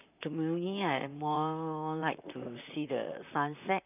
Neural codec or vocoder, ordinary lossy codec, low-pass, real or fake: vocoder, 44.1 kHz, 128 mel bands, Pupu-Vocoder; none; 3.6 kHz; fake